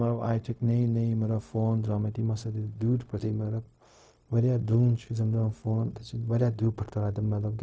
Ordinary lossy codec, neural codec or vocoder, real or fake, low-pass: none; codec, 16 kHz, 0.4 kbps, LongCat-Audio-Codec; fake; none